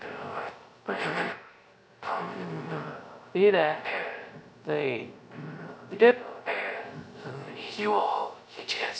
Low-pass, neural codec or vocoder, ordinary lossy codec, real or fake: none; codec, 16 kHz, 0.3 kbps, FocalCodec; none; fake